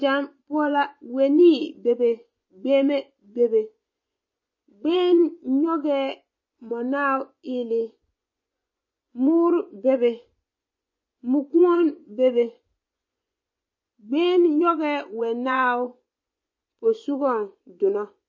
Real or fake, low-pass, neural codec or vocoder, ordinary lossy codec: fake; 7.2 kHz; vocoder, 24 kHz, 100 mel bands, Vocos; MP3, 32 kbps